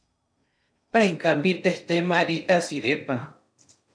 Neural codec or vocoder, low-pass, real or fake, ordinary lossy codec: codec, 16 kHz in and 24 kHz out, 0.6 kbps, FocalCodec, streaming, 2048 codes; 9.9 kHz; fake; MP3, 96 kbps